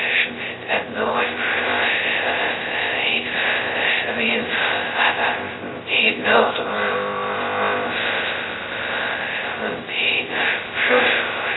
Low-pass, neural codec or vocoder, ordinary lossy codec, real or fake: 7.2 kHz; codec, 16 kHz, 0.2 kbps, FocalCodec; AAC, 16 kbps; fake